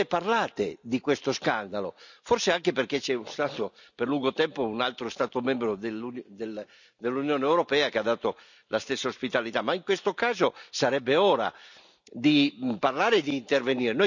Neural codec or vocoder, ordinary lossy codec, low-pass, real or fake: none; none; 7.2 kHz; real